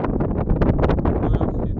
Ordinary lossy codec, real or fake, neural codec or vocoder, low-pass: none; real; none; 7.2 kHz